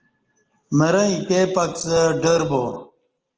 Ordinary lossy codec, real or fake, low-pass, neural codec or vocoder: Opus, 16 kbps; real; 7.2 kHz; none